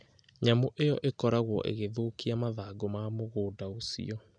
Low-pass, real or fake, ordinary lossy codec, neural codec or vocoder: 9.9 kHz; real; none; none